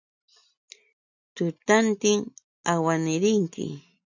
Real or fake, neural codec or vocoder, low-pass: real; none; 7.2 kHz